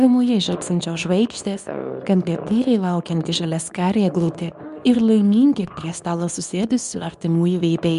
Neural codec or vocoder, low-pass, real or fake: codec, 24 kHz, 0.9 kbps, WavTokenizer, medium speech release version 2; 10.8 kHz; fake